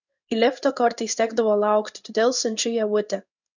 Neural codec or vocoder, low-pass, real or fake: codec, 16 kHz in and 24 kHz out, 1 kbps, XY-Tokenizer; 7.2 kHz; fake